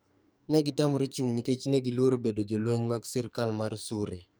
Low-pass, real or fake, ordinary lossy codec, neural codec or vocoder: none; fake; none; codec, 44.1 kHz, 2.6 kbps, SNAC